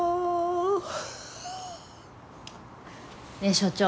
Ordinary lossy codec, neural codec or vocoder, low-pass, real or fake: none; none; none; real